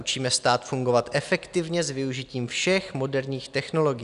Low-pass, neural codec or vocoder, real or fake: 10.8 kHz; none; real